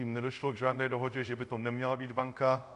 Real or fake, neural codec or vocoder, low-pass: fake; codec, 24 kHz, 0.5 kbps, DualCodec; 10.8 kHz